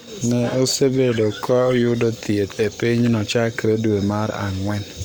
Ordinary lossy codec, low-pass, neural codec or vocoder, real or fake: none; none; codec, 44.1 kHz, 7.8 kbps, Pupu-Codec; fake